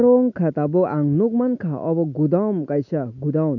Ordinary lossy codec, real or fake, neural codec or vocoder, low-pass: none; real; none; 7.2 kHz